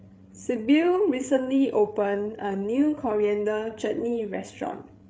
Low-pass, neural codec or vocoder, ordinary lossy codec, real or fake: none; codec, 16 kHz, 8 kbps, FreqCodec, larger model; none; fake